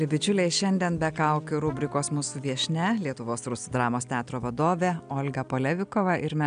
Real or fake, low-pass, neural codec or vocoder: real; 9.9 kHz; none